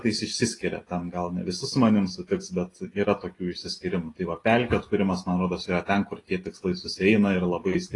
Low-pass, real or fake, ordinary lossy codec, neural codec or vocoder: 10.8 kHz; real; AAC, 32 kbps; none